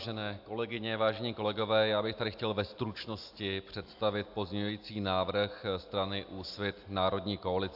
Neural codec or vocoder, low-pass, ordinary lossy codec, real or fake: none; 5.4 kHz; AAC, 48 kbps; real